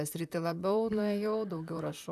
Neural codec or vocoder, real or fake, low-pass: vocoder, 44.1 kHz, 128 mel bands, Pupu-Vocoder; fake; 14.4 kHz